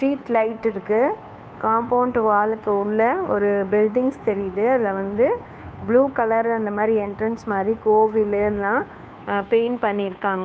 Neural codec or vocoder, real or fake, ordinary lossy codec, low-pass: codec, 16 kHz, 2 kbps, FunCodec, trained on Chinese and English, 25 frames a second; fake; none; none